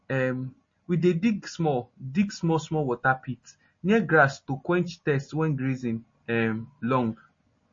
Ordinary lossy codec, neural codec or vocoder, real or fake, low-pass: MP3, 32 kbps; none; real; 7.2 kHz